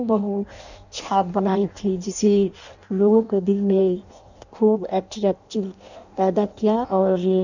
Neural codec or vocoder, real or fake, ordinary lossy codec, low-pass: codec, 16 kHz in and 24 kHz out, 0.6 kbps, FireRedTTS-2 codec; fake; none; 7.2 kHz